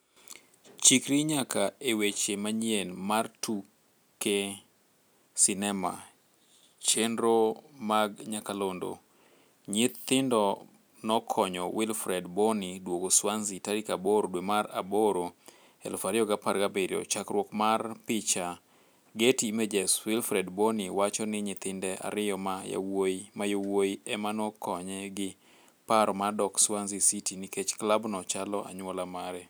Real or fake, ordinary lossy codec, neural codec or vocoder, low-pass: real; none; none; none